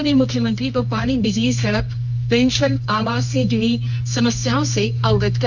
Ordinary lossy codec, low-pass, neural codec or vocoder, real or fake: none; 7.2 kHz; codec, 24 kHz, 0.9 kbps, WavTokenizer, medium music audio release; fake